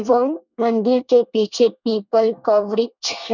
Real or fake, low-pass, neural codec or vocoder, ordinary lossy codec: fake; 7.2 kHz; codec, 24 kHz, 1 kbps, SNAC; none